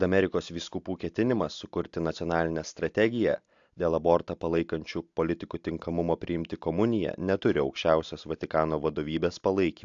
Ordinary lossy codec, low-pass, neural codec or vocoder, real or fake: AAC, 64 kbps; 7.2 kHz; none; real